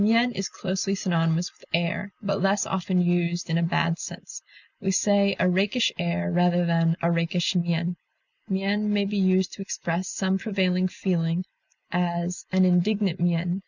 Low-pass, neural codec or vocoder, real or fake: 7.2 kHz; none; real